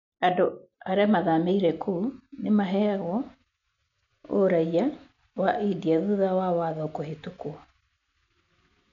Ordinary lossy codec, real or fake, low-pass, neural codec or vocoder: none; real; 7.2 kHz; none